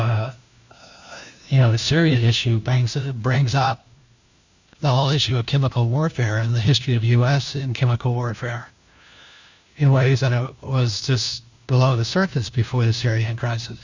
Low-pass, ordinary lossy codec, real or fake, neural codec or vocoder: 7.2 kHz; Opus, 64 kbps; fake; codec, 16 kHz, 1 kbps, FunCodec, trained on LibriTTS, 50 frames a second